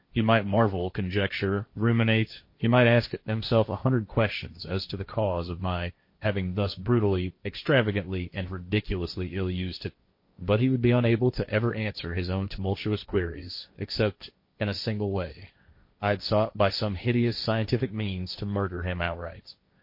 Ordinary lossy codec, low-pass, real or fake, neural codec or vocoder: MP3, 32 kbps; 5.4 kHz; fake; codec, 16 kHz, 1.1 kbps, Voila-Tokenizer